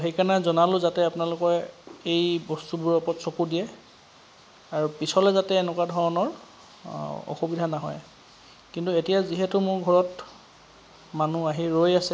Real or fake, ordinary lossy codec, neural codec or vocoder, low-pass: real; none; none; none